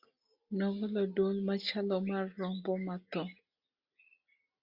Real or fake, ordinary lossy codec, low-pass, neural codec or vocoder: real; Opus, 64 kbps; 5.4 kHz; none